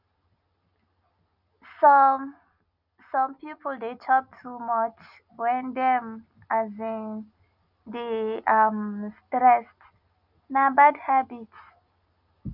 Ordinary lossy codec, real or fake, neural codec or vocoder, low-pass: none; real; none; 5.4 kHz